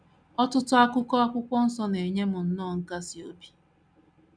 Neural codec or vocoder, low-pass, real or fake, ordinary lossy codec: none; 9.9 kHz; real; none